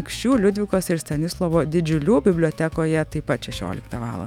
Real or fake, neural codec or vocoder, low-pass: fake; autoencoder, 48 kHz, 128 numbers a frame, DAC-VAE, trained on Japanese speech; 19.8 kHz